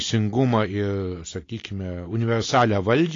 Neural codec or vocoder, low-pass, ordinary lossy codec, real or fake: none; 7.2 kHz; AAC, 32 kbps; real